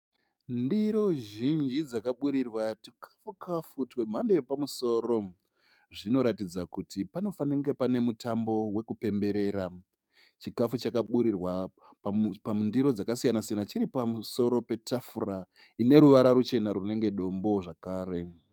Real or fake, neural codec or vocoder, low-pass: fake; codec, 44.1 kHz, 7.8 kbps, DAC; 19.8 kHz